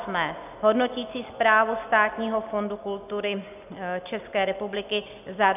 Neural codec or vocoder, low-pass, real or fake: none; 3.6 kHz; real